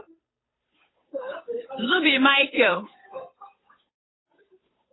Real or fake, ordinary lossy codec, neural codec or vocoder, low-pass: fake; AAC, 16 kbps; codec, 16 kHz, 8 kbps, FunCodec, trained on Chinese and English, 25 frames a second; 7.2 kHz